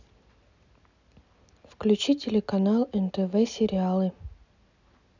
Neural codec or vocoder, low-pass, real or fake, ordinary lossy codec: none; 7.2 kHz; real; none